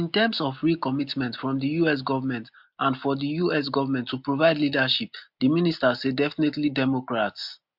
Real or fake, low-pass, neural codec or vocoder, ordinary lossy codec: real; 5.4 kHz; none; MP3, 48 kbps